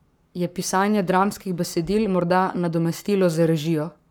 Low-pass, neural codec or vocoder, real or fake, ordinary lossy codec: none; codec, 44.1 kHz, 7.8 kbps, Pupu-Codec; fake; none